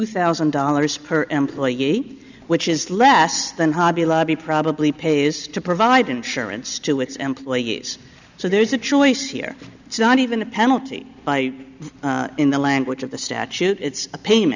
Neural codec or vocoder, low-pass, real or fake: none; 7.2 kHz; real